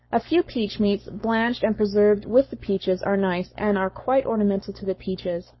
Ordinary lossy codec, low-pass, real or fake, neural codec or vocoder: MP3, 24 kbps; 7.2 kHz; fake; codec, 44.1 kHz, 7.8 kbps, Pupu-Codec